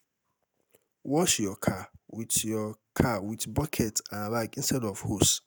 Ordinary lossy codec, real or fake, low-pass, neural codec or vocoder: none; real; none; none